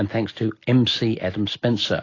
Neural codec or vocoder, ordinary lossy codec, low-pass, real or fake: none; AAC, 32 kbps; 7.2 kHz; real